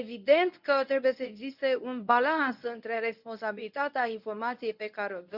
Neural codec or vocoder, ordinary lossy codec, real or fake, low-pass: codec, 24 kHz, 0.9 kbps, WavTokenizer, medium speech release version 1; none; fake; 5.4 kHz